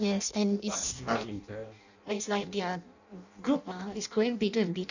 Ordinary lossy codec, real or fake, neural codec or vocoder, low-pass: none; fake; codec, 16 kHz in and 24 kHz out, 0.6 kbps, FireRedTTS-2 codec; 7.2 kHz